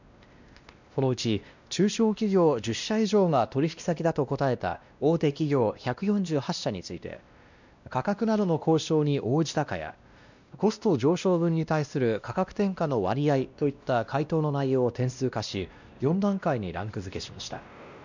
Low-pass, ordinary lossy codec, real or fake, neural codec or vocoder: 7.2 kHz; none; fake; codec, 16 kHz, 1 kbps, X-Codec, WavLM features, trained on Multilingual LibriSpeech